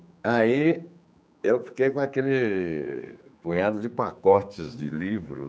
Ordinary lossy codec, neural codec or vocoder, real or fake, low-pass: none; codec, 16 kHz, 4 kbps, X-Codec, HuBERT features, trained on general audio; fake; none